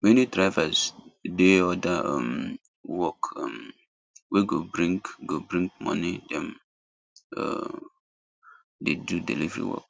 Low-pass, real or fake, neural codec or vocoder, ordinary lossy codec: none; real; none; none